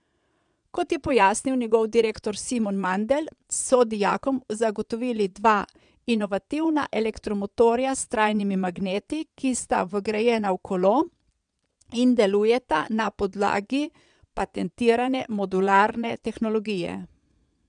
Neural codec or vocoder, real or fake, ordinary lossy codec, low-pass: vocoder, 22.05 kHz, 80 mel bands, Vocos; fake; none; 9.9 kHz